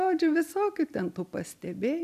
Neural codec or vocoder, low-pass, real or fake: none; 14.4 kHz; real